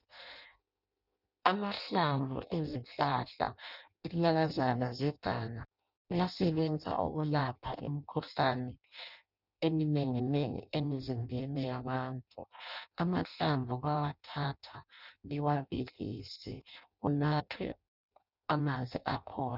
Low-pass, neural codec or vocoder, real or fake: 5.4 kHz; codec, 16 kHz in and 24 kHz out, 0.6 kbps, FireRedTTS-2 codec; fake